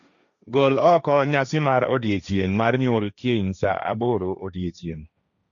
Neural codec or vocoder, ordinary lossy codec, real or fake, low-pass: codec, 16 kHz, 1.1 kbps, Voila-Tokenizer; none; fake; 7.2 kHz